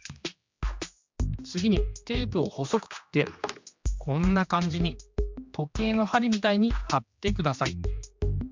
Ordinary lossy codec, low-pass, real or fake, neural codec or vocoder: MP3, 64 kbps; 7.2 kHz; fake; codec, 16 kHz, 2 kbps, X-Codec, HuBERT features, trained on general audio